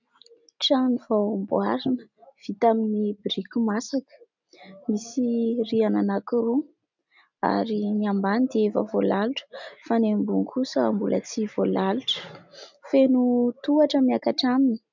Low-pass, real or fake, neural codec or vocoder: 7.2 kHz; real; none